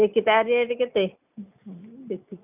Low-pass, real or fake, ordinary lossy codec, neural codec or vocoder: 3.6 kHz; real; none; none